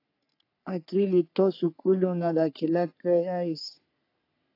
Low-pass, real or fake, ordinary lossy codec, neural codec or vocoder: 5.4 kHz; fake; AAC, 32 kbps; codec, 44.1 kHz, 3.4 kbps, Pupu-Codec